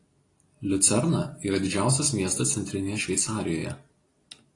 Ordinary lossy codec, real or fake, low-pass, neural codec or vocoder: AAC, 32 kbps; real; 10.8 kHz; none